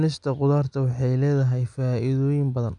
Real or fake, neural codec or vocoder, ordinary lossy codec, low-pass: real; none; none; 9.9 kHz